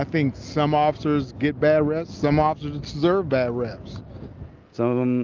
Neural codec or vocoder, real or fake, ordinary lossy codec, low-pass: none; real; Opus, 24 kbps; 7.2 kHz